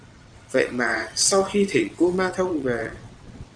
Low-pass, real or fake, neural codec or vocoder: 9.9 kHz; fake; vocoder, 22.05 kHz, 80 mel bands, WaveNeXt